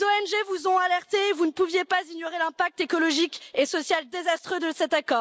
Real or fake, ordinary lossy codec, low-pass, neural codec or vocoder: real; none; none; none